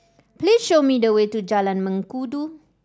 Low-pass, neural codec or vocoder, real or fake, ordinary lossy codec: none; none; real; none